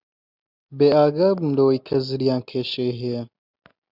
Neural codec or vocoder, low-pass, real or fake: none; 5.4 kHz; real